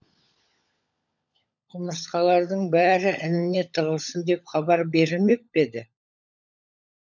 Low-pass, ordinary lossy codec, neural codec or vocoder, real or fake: 7.2 kHz; none; codec, 16 kHz, 16 kbps, FunCodec, trained on LibriTTS, 50 frames a second; fake